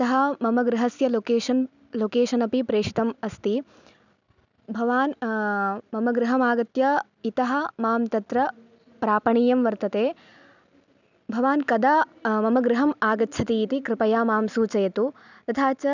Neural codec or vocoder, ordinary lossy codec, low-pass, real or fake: none; none; 7.2 kHz; real